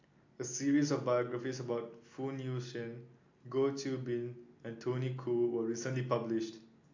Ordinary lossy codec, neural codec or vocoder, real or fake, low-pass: AAC, 48 kbps; none; real; 7.2 kHz